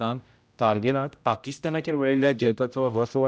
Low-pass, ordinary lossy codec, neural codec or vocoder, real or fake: none; none; codec, 16 kHz, 0.5 kbps, X-Codec, HuBERT features, trained on general audio; fake